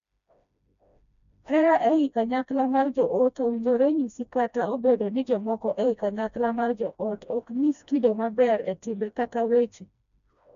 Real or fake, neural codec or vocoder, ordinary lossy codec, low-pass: fake; codec, 16 kHz, 1 kbps, FreqCodec, smaller model; none; 7.2 kHz